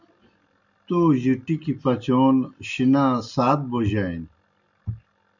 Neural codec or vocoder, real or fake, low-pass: none; real; 7.2 kHz